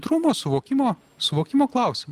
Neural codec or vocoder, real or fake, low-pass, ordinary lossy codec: none; real; 14.4 kHz; Opus, 32 kbps